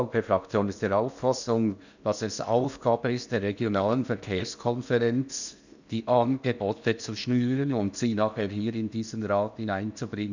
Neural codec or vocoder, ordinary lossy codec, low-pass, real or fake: codec, 16 kHz in and 24 kHz out, 0.8 kbps, FocalCodec, streaming, 65536 codes; none; 7.2 kHz; fake